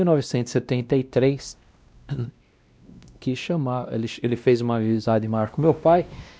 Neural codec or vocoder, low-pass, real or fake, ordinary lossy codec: codec, 16 kHz, 1 kbps, X-Codec, WavLM features, trained on Multilingual LibriSpeech; none; fake; none